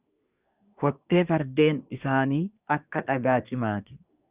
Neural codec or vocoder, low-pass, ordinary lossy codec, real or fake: codec, 24 kHz, 1 kbps, SNAC; 3.6 kHz; Opus, 64 kbps; fake